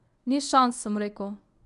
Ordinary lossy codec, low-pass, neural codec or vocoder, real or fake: MP3, 96 kbps; 10.8 kHz; codec, 24 kHz, 0.9 kbps, WavTokenizer, medium speech release version 1; fake